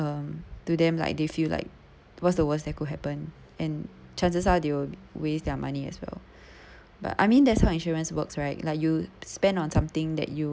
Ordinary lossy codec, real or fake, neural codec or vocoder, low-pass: none; real; none; none